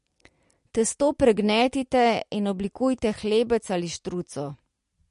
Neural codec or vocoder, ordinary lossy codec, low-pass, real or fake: none; MP3, 48 kbps; 10.8 kHz; real